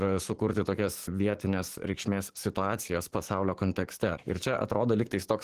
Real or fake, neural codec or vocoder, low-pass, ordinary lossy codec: fake; codec, 44.1 kHz, 7.8 kbps, DAC; 14.4 kHz; Opus, 16 kbps